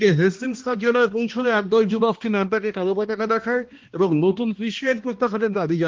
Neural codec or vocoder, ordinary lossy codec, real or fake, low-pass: codec, 16 kHz, 1 kbps, X-Codec, HuBERT features, trained on balanced general audio; Opus, 16 kbps; fake; 7.2 kHz